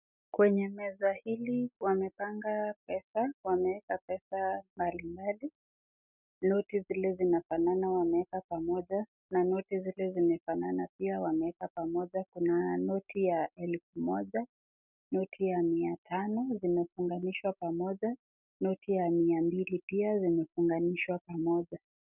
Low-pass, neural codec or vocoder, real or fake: 3.6 kHz; none; real